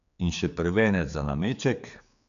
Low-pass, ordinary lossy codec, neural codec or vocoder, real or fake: 7.2 kHz; MP3, 96 kbps; codec, 16 kHz, 4 kbps, X-Codec, HuBERT features, trained on general audio; fake